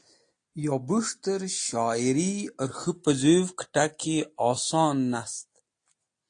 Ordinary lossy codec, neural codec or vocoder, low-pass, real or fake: AAC, 48 kbps; none; 9.9 kHz; real